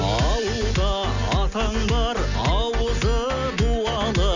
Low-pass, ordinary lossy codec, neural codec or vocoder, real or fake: 7.2 kHz; none; none; real